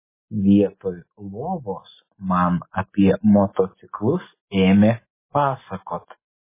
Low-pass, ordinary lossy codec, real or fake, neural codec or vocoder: 3.6 kHz; MP3, 16 kbps; real; none